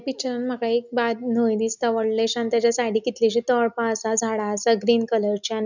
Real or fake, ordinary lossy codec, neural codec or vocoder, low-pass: real; none; none; 7.2 kHz